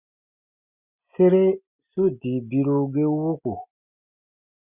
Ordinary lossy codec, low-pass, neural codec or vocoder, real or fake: Opus, 64 kbps; 3.6 kHz; none; real